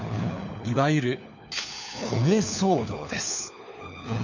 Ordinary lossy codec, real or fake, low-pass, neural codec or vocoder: none; fake; 7.2 kHz; codec, 16 kHz, 4 kbps, FunCodec, trained on LibriTTS, 50 frames a second